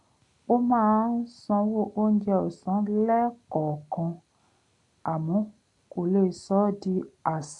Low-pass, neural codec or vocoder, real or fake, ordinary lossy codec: 10.8 kHz; none; real; AAC, 64 kbps